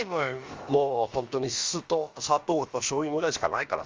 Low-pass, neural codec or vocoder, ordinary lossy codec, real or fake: 7.2 kHz; codec, 16 kHz, about 1 kbps, DyCAST, with the encoder's durations; Opus, 32 kbps; fake